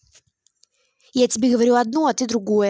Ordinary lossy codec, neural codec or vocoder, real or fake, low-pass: none; none; real; none